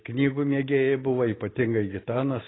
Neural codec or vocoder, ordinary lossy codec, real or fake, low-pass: none; AAC, 16 kbps; real; 7.2 kHz